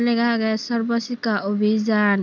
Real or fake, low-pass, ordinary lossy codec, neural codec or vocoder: real; 7.2 kHz; none; none